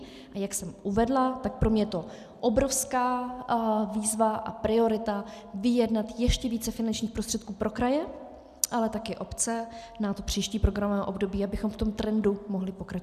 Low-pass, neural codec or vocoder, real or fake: 14.4 kHz; none; real